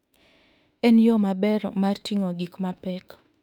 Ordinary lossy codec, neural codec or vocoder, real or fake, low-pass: none; autoencoder, 48 kHz, 32 numbers a frame, DAC-VAE, trained on Japanese speech; fake; 19.8 kHz